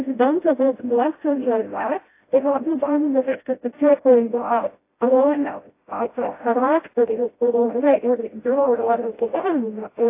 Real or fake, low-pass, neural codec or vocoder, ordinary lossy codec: fake; 3.6 kHz; codec, 16 kHz, 0.5 kbps, FreqCodec, smaller model; AAC, 24 kbps